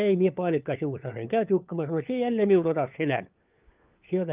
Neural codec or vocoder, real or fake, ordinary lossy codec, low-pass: codec, 16 kHz, 4 kbps, FunCodec, trained on LibriTTS, 50 frames a second; fake; Opus, 32 kbps; 3.6 kHz